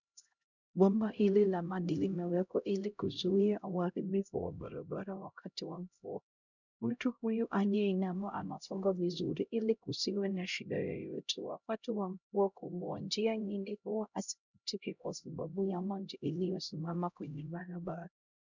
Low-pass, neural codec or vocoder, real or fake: 7.2 kHz; codec, 16 kHz, 0.5 kbps, X-Codec, HuBERT features, trained on LibriSpeech; fake